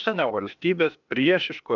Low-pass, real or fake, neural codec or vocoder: 7.2 kHz; fake; codec, 16 kHz, 0.8 kbps, ZipCodec